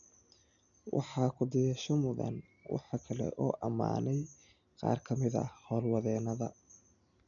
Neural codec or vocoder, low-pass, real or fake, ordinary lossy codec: none; 7.2 kHz; real; none